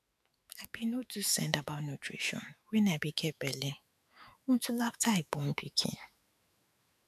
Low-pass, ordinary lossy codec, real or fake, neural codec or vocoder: 14.4 kHz; none; fake; autoencoder, 48 kHz, 128 numbers a frame, DAC-VAE, trained on Japanese speech